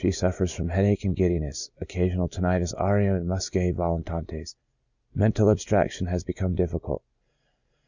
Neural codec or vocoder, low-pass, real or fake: none; 7.2 kHz; real